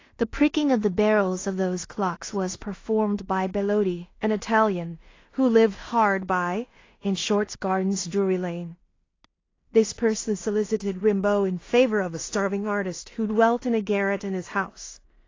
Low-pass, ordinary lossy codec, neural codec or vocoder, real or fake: 7.2 kHz; AAC, 32 kbps; codec, 16 kHz in and 24 kHz out, 0.4 kbps, LongCat-Audio-Codec, two codebook decoder; fake